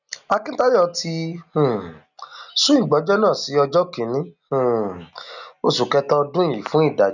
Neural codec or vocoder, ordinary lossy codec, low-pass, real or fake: none; none; 7.2 kHz; real